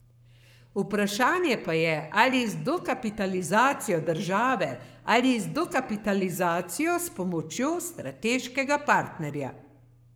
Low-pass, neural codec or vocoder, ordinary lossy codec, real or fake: none; codec, 44.1 kHz, 7.8 kbps, Pupu-Codec; none; fake